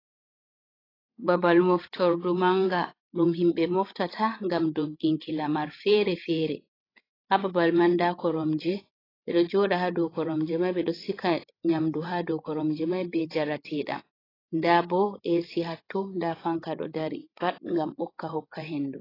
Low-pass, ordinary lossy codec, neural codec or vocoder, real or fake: 5.4 kHz; AAC, 24 kbps; codec, 16 kHz, 16 kbps, FreqCodec, larger model; fake